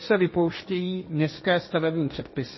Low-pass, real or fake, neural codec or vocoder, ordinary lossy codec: 7.2 kHz; fake; codec, 16 kHz, 1.1 kbps, Voila-Tokenizer; MP3, 24 kbps